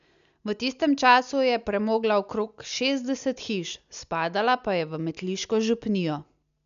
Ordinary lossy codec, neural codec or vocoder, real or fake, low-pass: none; none; real; 7.2 kHz